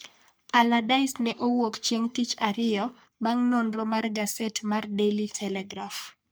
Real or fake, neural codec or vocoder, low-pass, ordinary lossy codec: fake; codec, 44.1 kHz, 3.4 kbps, Pupu-Codec; none; none